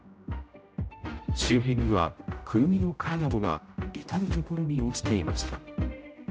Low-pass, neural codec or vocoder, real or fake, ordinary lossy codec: none; codec, 16 kHz, 0.5 kbps, X-Codec, HuBERT features, trained on general audio; fake; none